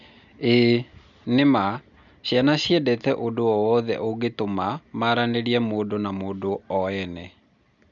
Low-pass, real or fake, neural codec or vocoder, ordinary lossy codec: 7.2 kHz; real; none; none